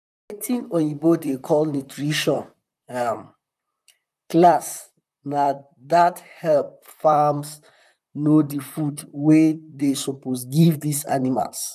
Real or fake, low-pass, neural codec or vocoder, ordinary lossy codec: fake; 14.4 kHz; vocoder, 44.1 kHz, 128 mel bands, Pupu-Vocoder; none